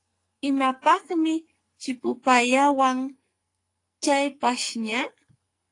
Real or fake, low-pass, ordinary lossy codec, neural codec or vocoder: fake; 10.8 kHz; AAC, 48 kbps; codec, 44.1 kHz, 2.6 kbps, SNAC